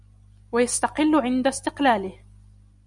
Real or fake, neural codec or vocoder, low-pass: real; none; 10.8 kHz